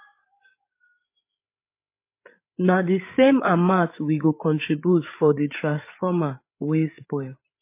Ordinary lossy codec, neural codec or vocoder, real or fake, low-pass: MP3, 24 kbps; codec, 16 kHz, 8 kbps, FreqCodec, larger model; fake; 3.6 kHz